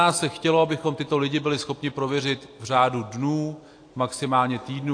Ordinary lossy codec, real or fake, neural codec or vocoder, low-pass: AAC, 48 kbps; real; none; 9.9 kHz